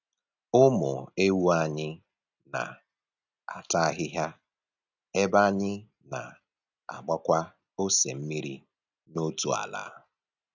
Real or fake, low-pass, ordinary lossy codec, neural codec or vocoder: real; 7.2 kHz; none; none